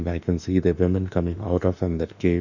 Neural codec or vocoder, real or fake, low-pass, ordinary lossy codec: autoencoder, 48 kHz, 32 numbers a frame, DAC-VAE, trained on Japanese speech; fake; 7.2 kHz; none